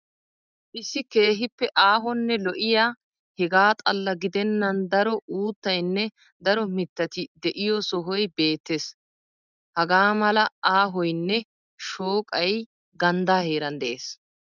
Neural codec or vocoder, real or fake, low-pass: none; real; 7.2 kHz